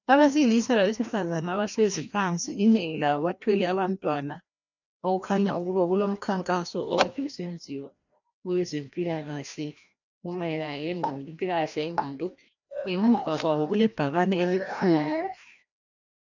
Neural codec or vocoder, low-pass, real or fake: codec, 16 kHz, 1 kbps, FreqCodec, larger model; 7.2 kHz; fake